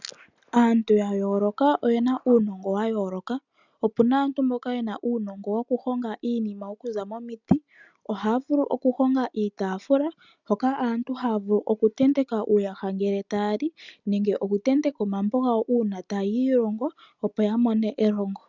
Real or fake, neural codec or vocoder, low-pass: real; none; 7.2 kHz